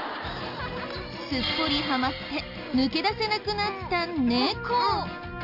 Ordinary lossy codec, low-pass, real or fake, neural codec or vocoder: none; 5.4 kHz; real; none